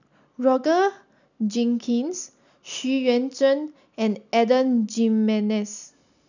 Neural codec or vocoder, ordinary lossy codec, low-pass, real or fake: none; none; 7.2 kHz; real